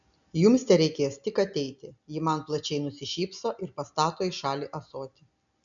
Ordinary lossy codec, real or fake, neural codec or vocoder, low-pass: MP3, 96 kbps; real; none; 7.2 kHz